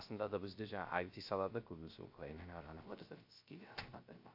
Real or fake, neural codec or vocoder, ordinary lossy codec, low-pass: fake; codec, 16 kHz, 0.3 kbps, FocalCodec; AAC, 48 kbps; 5.4 kHz